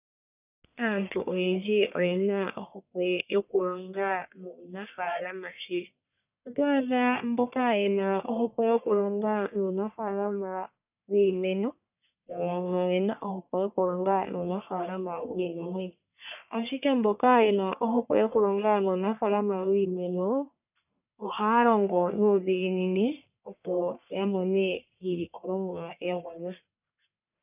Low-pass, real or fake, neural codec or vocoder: 3.6 kHz; fake; codec, 44.1 kHz, 1.7 kbps, Pupu-Codec